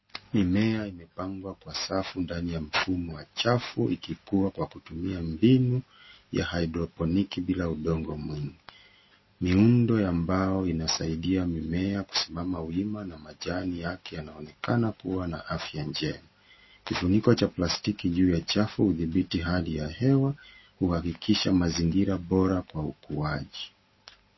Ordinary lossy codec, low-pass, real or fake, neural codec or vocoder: MP3, 24 kbps; 7.2 kHz; real; none